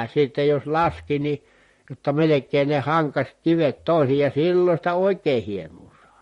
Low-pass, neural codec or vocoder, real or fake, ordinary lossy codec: 19.8 kHz; vocoder, 44.1 kHz, 128 mel bands, Pupu-Vocoder; fake; MP3, 48 kbps